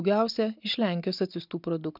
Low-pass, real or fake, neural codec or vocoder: 5.4 kHz; real; none